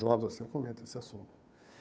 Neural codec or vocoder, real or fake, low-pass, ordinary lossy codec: codec, 16 kHz, 2 kbps, FunCodec, trained on Chinese and English, 25 frames a second; fake; none; none